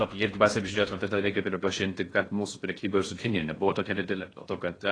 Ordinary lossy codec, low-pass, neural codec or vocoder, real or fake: AAC, 32 kbps; 9.9 kHz; codec, 16 kHz in and 24 kHz out, 0.6 kbps, FocalCodec, streaming, 4096 codes; fake